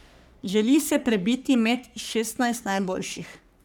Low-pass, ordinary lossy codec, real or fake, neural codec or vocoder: none; none; fake; codec, 44.1 kHz, 3.4 kbps, Pupu-Codec